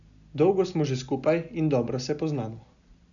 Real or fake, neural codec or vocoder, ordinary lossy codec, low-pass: real; none; none; 7.2 kHz